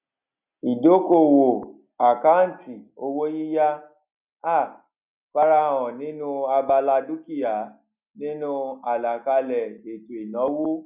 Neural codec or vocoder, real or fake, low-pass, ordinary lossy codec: none; real; 3.6 kHz; none